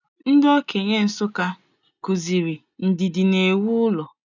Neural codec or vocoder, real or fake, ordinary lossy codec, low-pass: none; real; none; 7.2 kHz